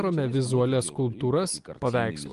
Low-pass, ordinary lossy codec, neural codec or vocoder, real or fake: 10.8 kHz; Opus, 32 kbps; none; real